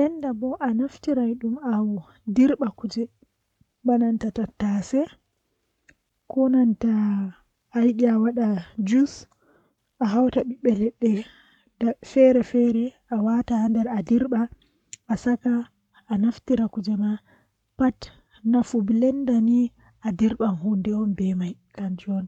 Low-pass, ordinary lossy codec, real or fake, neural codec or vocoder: 19.8 kHz; none; fake; codec, 44.1 kHz, 7.8 kbps, Pupu-Codec